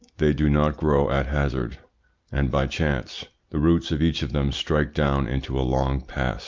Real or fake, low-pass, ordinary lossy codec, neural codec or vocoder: real; 7.2 kHz; Opus, 24 kbps; none